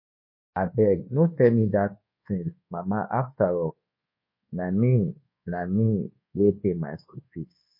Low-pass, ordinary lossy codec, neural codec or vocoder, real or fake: 5.4 kHz; MP3, 24 kbps; codec, 24 kHz, 1.2 kbps, DualCodec; fake